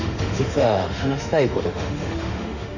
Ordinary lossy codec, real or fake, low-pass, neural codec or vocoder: none; fake; 7.2 kHz; autoencoder, 48 kHz, 32 numbers a frame, DAC-VAE, trained on Japanese speech